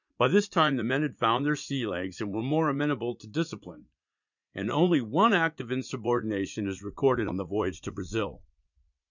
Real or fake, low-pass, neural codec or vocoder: fake; 7.2 kHz; vocoder, 44.1 kHz, 80 mel bands, Vocos